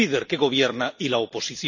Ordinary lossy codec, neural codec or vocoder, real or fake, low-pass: none; none; real; 7.2 kHz